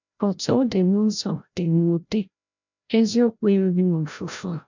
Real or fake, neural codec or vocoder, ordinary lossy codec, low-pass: fake; codec, 16 kHz, 0.5 kbps, FreqCodec, larger model; none; 7.2 kHz